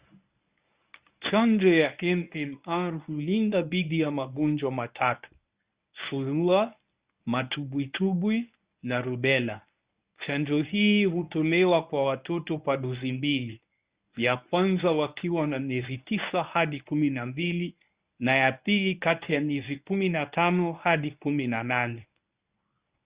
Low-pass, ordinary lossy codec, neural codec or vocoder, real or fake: 3.6 kHz; Opus, 64 kbps; codec, 24 kHz, 0.9 kbps, WavTokenizer, medium speech release version 1; fake